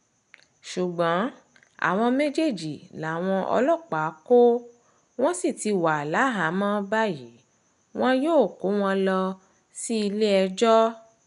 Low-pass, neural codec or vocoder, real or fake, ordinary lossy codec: 10.8 kHz; none; real; none